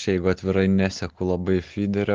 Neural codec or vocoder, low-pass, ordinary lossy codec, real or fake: none; 7.2 kHz; Opus, 16 kbps; real